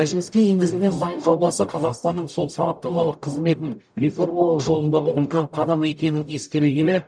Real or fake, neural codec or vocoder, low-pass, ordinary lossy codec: fake; codec, 44.1 kHz, 0.9 kbps, DAC; 9.9 kHz; none